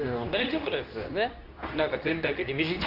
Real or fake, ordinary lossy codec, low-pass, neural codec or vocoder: fake; none; 5.4 kHz; codec, 24 kHz, 0.9 kbps, WavTokenizer, medium speech release version 2